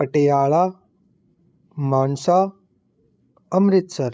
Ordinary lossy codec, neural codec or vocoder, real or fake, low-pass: none; codec, 16 kHz, 8 kbps, FreqCodec, larger model; fake; none